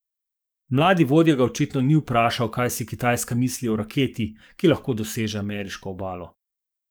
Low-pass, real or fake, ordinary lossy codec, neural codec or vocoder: none; fake; none; codec, 44.1 kHz, 7.8 kbps, DAC